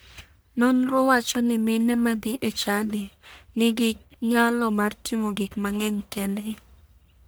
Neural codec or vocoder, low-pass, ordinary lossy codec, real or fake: codec, 44.1 kHz, 1.7 kbps, Pupu-Codec; none; none; fake